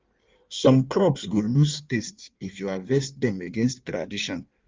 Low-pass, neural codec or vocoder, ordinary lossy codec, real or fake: 7.2 kHz; codec, 16 kHz in and 24 kHz out, 1.1 kbps, FireRedTTS-2 codec; Opus, 32 kbps; fake